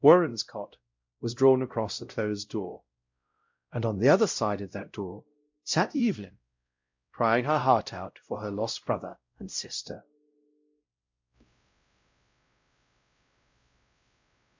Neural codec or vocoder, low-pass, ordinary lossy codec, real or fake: codec, 16 kHz, 0.5 kbps, X-Codec, WavLM features, trained on Multilingual LibriSpeech; 7.2 kHz; MP3, 64 kbps; fake